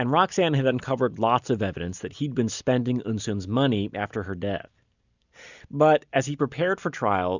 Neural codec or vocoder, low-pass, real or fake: none; 7.2 kHz; real